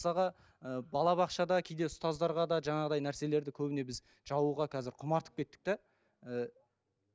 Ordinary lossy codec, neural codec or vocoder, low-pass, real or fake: none; none; none; real